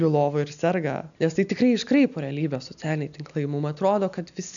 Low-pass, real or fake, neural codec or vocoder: 7.2 kHz; real; none